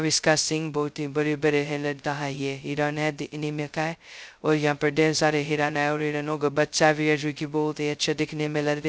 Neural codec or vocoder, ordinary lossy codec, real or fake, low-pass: codec, 16 kHz, 0.2 kbps, FocalCodec; none; fake; none